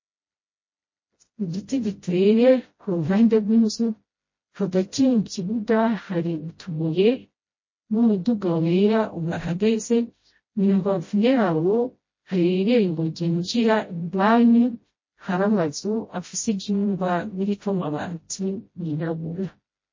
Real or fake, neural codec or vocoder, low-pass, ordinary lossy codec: fake; codec, 16 kHz, 0.5 kbps, FreqCodec, smaller model; 7.2 kHz; MP3, 32 kbps